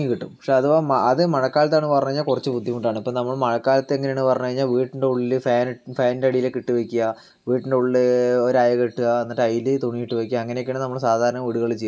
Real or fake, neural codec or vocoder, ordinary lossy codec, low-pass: real; none; none; none